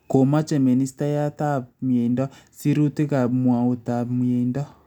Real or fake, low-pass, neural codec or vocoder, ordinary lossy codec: real; 19.8 kHz; none; none